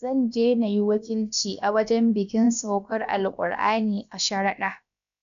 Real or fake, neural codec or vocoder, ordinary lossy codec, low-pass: fake; codec, 16 kHz, about 1 kbps, DyCAST, with the encoder's durations; none; 7.2 kHz